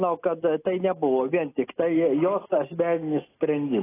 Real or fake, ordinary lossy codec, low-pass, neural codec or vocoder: real; AAC, 16 kbps; 3.6 kHz; none